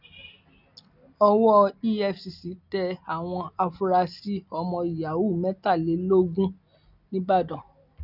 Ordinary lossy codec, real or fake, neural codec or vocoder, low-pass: none; real; none; 5.4 kHz